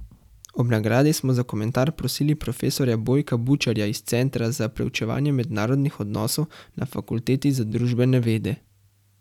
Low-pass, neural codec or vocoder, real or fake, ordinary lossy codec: 19.8 kHz; none; real; none